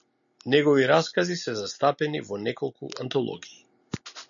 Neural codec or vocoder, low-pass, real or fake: none; 7.2 kHz; real